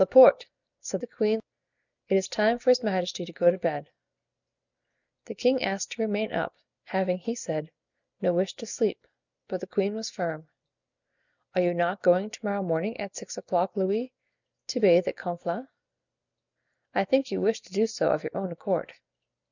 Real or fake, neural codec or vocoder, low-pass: real; none; 7.2 kHz